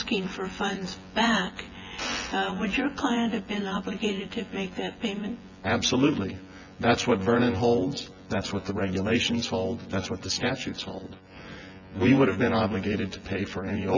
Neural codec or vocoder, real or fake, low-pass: vocoder, 24 kHz, 100 mel bands, Vocos; fake; 7.2 kHz